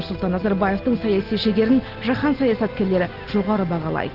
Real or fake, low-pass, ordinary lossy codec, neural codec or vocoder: real; 5.4 kHz; Opus, 16 kbps; none